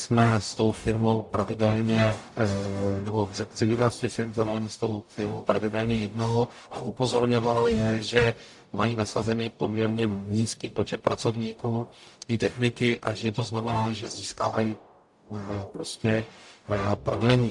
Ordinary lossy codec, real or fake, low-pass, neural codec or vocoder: AAC, 64 kbps; fake; 10.8 kHz; codec, 44.1 kHz, 0.9 kbps, DAC